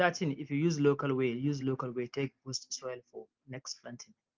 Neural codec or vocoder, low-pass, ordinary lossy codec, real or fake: none; 7.2 kHz; Opus, 32 kbps; real